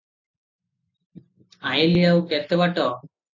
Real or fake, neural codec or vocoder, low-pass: real; none; 7.2 kHz